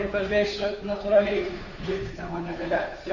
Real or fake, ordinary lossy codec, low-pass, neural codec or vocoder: fake; AAC, 48 kbps; 7.2 kHz; codec, 16 kHz, 2 kbps, FunCodec, trained on Chinese and English, 25 frames a second